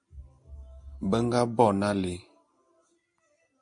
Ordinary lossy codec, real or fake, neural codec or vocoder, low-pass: MP3, 48 kbps; real; none; 9.9 kHz